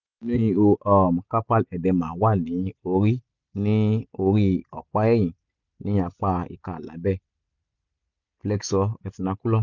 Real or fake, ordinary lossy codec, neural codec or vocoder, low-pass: real; none; none; 7.2 kHz